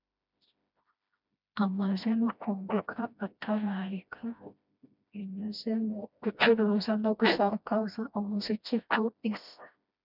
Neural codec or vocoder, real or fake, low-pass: codec, 16 kHz, 1 kbps, FreqCodec, smaller model; fake; 5.4 kHz